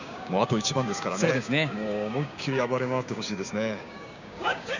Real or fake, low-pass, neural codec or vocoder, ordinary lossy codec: fake; 7.2 kHz; codec, 16 kHz, 6 kbps, DAC; none